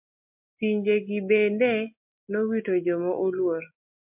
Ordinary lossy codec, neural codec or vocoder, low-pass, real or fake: MP3, 32 kbps; none; 3.6 kHz; real